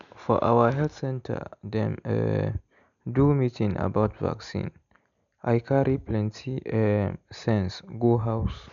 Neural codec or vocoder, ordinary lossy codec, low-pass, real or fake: none; none; 7.2 kHz; real